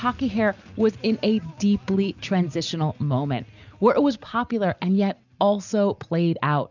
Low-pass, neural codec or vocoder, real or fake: 7.2 kHz; none; real